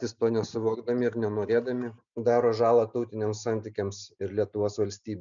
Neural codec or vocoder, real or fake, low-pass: none; real; 7.2 kHz